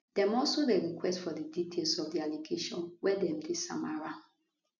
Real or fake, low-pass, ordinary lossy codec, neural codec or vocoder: real; 7.2 kHz; none; none